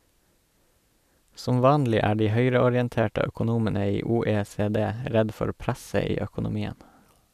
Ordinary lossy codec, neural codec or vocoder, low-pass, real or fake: none; none; 14.4 kHz; real